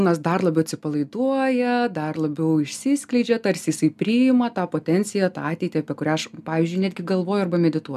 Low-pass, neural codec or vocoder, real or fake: 14.4 kHz; none; real